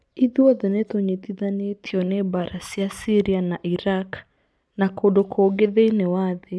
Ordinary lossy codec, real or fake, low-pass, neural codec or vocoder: none; real; none; none